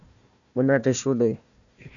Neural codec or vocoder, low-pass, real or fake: codec, 16 kHz, 1 kbps, FunCodec, trained on Chinese and English, 50 frames a second; 7.2 kHz; fake